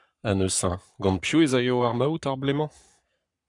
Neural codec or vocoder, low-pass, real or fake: codec, 44.1 kHz, 7.8 kbps, Pupu-Codec; 10.8 kHz; fake